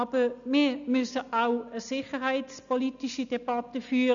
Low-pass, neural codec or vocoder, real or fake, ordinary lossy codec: 7.2 kHz; none; real; none